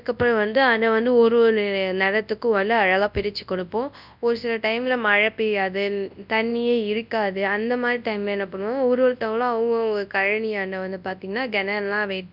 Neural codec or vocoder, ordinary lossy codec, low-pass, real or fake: codec, 24 kHz, 0.9 kbps, WavTokenizer, large speech release; none; 5.4 kHz; fake